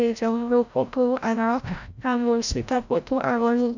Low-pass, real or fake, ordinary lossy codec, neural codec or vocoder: 7.2 kHz; fake; none; codec, 16 kHz, 0.5 kbps, FreqCodec, larger model